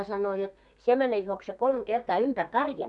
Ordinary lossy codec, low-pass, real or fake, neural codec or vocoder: none; 10.8 kHz; fake; codec, 32 kHz, 1.9 kbps, SNAC